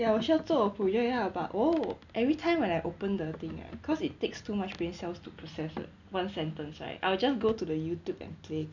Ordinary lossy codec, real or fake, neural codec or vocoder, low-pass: none; real; none; 7.2 kHz